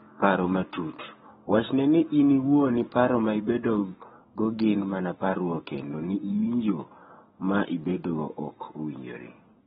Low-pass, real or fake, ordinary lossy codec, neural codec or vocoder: 19.8 kHz; fake; AAC, 16 kbps; codec, 44.1 kHz, 7.8 kbps, Pupu-Codec